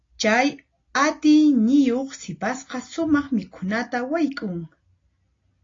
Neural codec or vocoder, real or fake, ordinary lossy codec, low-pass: none; real; AAC, 32 kbps; 7.2 kHz